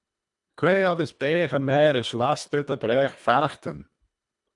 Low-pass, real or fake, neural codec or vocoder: 10.8 kHz; fake; codec, 24 kHz, 1.5 kbps, HILCodec